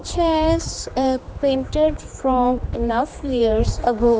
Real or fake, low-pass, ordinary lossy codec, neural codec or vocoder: fake; none; none; codec, 16 kHz, 2 kbps, X-Codec, HuBERT features, trained on general audio